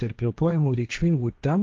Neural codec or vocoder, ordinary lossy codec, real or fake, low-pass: codec, 16 kHz, 1.1 kbps, Voila-Tokenizer; Opus, 32 kbps; fake; 7.2 kHz